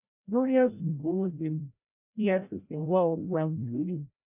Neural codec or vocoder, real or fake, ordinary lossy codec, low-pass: codec, 16 kHz, 0.5 kbps, FreqCodec, larger model; fake; none; 3.6 kHz